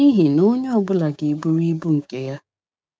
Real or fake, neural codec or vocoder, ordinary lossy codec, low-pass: fake; codec, 16 kHz, 6 kbps, DAC; none; none